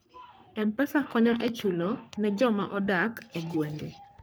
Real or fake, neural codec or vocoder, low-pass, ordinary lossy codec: fake; codec, 44.1 kHz, 3.4 kbps, Pupu-Codec; none; none